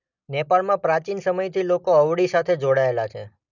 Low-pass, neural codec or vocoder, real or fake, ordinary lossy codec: 7.2 kHz; none; real; none